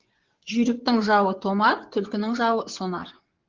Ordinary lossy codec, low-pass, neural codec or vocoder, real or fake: Opus, 16 kbps; 7.2 kHz; vocoder, 24 kHz, 100 mel bands, Vocos; fake